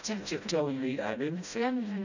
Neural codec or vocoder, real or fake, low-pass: codec, 16 kHz, 0.5 kbps, FreqCodec, smaller model; fake; 7.2 kHz